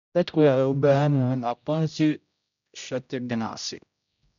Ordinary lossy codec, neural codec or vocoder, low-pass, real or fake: none; codec, 16 kHz, 0.5 kbps, X-Codec, HuBERT features, trained on general audio; 7.2 kHz; fake